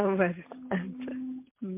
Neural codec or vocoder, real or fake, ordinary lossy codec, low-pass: none; real; MP3, 24 kbps; 3.6 kHz